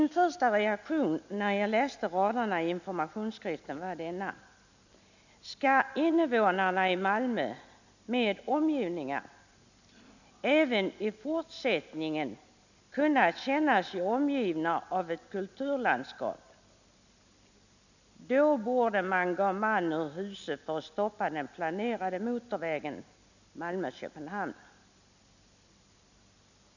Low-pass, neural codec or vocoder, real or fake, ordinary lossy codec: 7.2 kHz; none; real; none